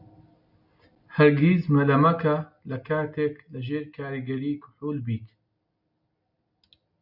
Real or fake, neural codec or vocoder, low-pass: real; none; 5.4 kHz